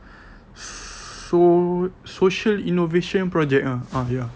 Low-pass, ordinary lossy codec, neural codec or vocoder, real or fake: none; none; none; real